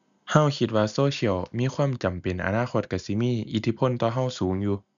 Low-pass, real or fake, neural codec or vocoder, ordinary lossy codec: 7.2 kHz; real; none; none